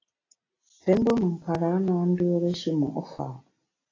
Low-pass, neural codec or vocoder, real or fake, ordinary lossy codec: 7.2 kHz; none; real; AAC, 32 kbps